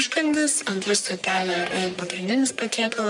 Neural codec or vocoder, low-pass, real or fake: codec, 44.1 kHz, 1.7 kbps, Pupu-Codec; 10.8 kHz; fake